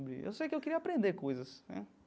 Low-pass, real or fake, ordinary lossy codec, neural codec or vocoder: none; real; none; none